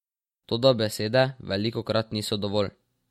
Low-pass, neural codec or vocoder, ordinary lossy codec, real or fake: 19.8 kHz; none; MP3, 64 kbps; real